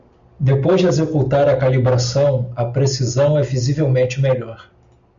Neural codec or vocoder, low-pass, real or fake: none; 7.2 kHz; real